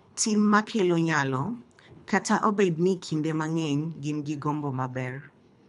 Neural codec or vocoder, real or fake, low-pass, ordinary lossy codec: codec, 24 kHz, 3 kbps, HILCodec; fake; 10.8 kHz; none